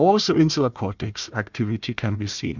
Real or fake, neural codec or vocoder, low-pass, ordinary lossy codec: fake; codec, 16 kHz, 1 kbps, FunCodec, trained on Chinese and English, 50 frames a second; 7.2 kHz; MP3, 64 kbps